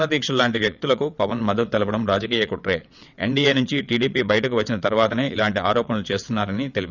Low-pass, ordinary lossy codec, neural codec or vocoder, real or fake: 7.2 kHz; none; vocoder, 22.05 kHz, 80 mel bands, WaveNeXt; fake